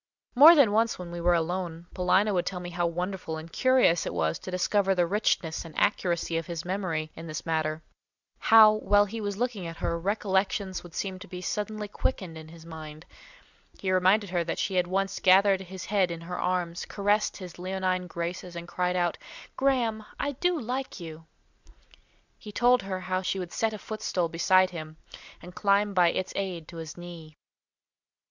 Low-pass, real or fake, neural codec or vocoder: 7.2 kHz; real; none